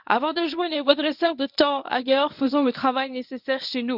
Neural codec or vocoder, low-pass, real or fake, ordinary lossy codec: codec, 24 kHz, 0.9 kbps, WavTokenizer, medium speech release version 1; 5.4 kHz; fake; none